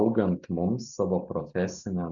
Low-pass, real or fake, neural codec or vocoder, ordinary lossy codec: 7.2 kHz; real; none; AAC, 64 kbps